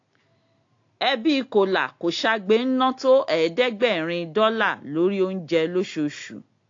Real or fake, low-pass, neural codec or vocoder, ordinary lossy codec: real; 7.2 kHz; none; AAC, 48 kbps